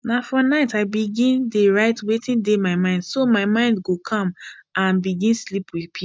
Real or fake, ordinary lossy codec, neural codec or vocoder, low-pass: real; none; none; none